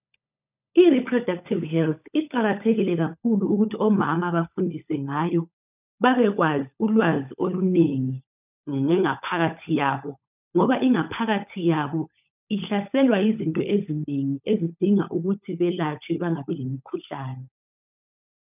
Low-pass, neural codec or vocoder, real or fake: 3.6 kHz; codec, 16 kHz, 16 kbps, FunCodec, trained on LibriTTS, 50 frames a second; fake